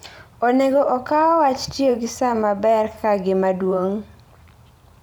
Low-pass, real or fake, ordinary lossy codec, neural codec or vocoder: none; fake; none; vocoder, 44.1 kHz, 128 mel bands every 512 samples, BigVGAN v2